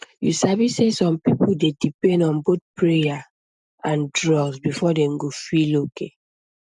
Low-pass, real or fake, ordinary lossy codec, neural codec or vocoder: 10.8 kHz; real; none; none